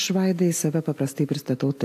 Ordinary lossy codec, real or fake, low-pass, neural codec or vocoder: AAC, 64 kbps; real; 14.4 kHz; none